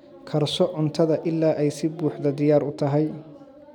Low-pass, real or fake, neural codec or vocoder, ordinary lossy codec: 19.8 kHz; real; none; none